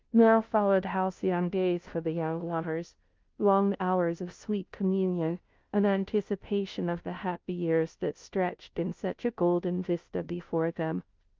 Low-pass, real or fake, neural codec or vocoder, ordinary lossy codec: 7.2 kHz; fake; codec, 16 kHz, 0.5 kbps, FunCodec, trained on Chinese and English, 25 frames a second; Opus, 32 kbps